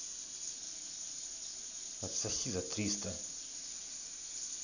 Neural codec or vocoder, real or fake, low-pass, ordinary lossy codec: none; real; 7.2 kHz; none